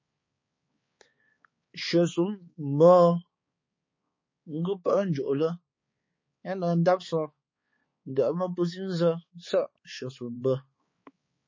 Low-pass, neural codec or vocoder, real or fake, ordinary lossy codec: 7.2 kHz; codec, 16 kHz, 4 kbps, X-Codec, HuBERT features, trained on balanced general audio; fake; MP3, 32 kbps